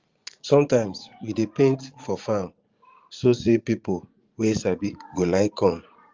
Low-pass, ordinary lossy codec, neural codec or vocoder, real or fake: 7.2 kHz; Opus, 32 kbps; vocoder, 44.1 kHz, 80 mel bands, Vocos; fake